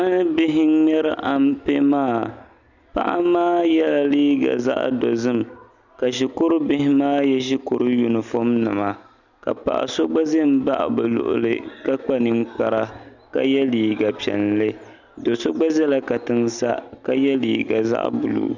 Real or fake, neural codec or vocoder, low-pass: real; none; 7.2 kHz